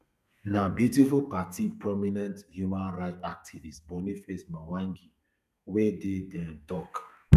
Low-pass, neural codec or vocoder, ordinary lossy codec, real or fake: 14.4 kHz; codec, 32 kHz, 1.9 kbps, SNAC; none; fake